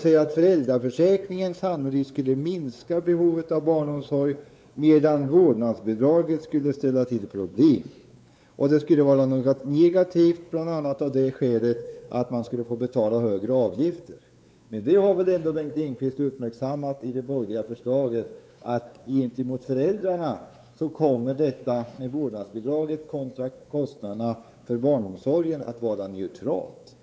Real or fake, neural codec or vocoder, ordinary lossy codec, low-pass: fake; codec, 16 kHz, 4 kbps, X-Codec, WavLM features, trained on Multilingual LibriSpeech; none; none